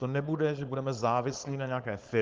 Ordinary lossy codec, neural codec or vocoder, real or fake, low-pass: Opus, 32 kbps; codec, 16 kHz, 4.8 kbps, FACodec; fake; 7.2 kHz